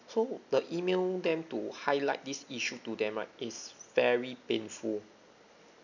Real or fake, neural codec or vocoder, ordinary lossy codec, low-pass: real; none; none; 7.2 kHz